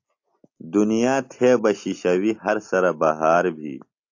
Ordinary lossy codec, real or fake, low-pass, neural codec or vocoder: AAC, 48 kbps; real; 7.2 kHz; none